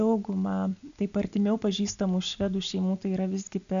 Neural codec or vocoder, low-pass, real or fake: none; 7.2 kHz; real